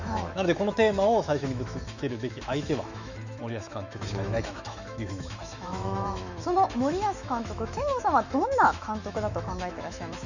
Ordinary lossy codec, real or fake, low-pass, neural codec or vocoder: none; fake; 7.2 kHz; autoencoder, 48 kHz, 128 numbers a frame, DAC-VAE, trained on Japanese speech